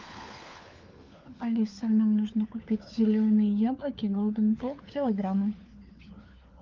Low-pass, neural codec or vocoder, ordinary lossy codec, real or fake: 7.2 kHz; codec, 16 kHz, 4 kbps, FunCodec, trained on LibriTTS, 50 frames a second; Opus, 24 kbps; fake